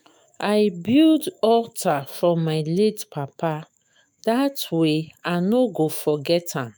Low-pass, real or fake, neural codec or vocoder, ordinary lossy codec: none; fake; autoencoder, 48 kHz, 128 numbers a frame, DAC-VAE, trained on Japanese speech; none